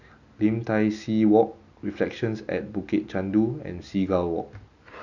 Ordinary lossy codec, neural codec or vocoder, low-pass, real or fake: none; none; 7.2 kHz; real